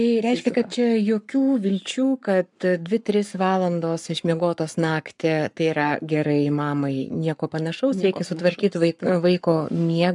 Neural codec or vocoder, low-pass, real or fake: codec, 44.1 kHz, 7.8 kbps, Pupu-Codec; 10.8 kHz; fake